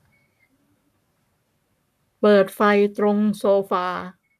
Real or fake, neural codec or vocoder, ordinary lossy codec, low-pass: fake; codec, 44.1 kHz, 7.8 kbps, Pupu-Codec; none; 14.4 kHz